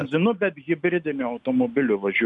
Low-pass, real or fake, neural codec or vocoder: 10.8 kHz; real; none